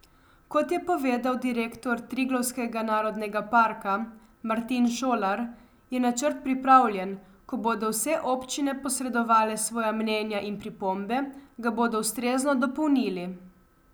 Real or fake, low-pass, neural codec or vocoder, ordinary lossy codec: real; none; none; none